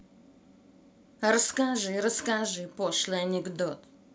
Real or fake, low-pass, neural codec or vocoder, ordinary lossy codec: real; none; none; none